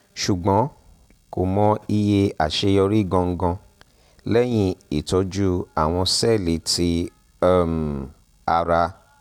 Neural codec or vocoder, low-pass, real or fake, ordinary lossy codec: none; 19.8 kHz; real; none